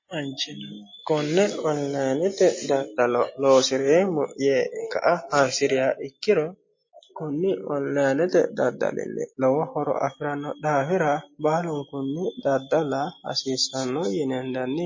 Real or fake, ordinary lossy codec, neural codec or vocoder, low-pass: real; MP3, 32 kbps; none; 7.2 kHz